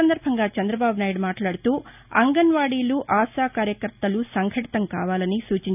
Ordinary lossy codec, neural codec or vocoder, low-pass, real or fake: none; none; 3.6 kHz; real